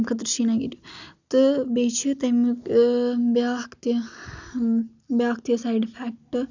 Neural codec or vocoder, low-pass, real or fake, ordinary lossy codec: none; 7.2 kHz; real; none